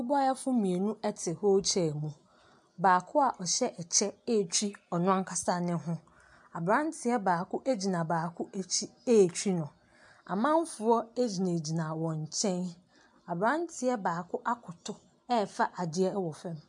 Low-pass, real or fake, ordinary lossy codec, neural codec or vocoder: 10.8 kHz; real; MP3, 64 kbps; none